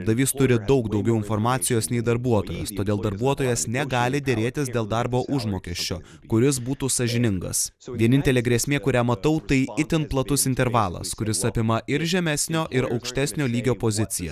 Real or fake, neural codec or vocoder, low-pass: real; none; 14.4 kHz